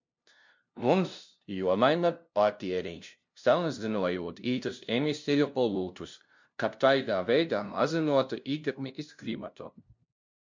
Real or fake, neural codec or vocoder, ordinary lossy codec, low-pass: fake; codec, 16 kHz, 0.5 kbps, FunCodec, trained on LibriTTS, 25 frames a second; MP3, 64 kbps; 7.2 kHz